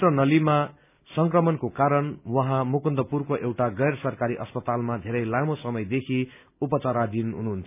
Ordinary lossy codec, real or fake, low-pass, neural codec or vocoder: none; real; 3.6 kHz; none